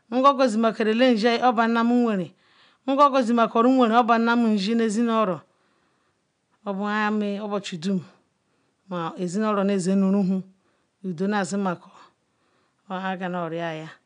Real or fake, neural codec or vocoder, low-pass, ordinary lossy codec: real; none; 9.9 kHz; none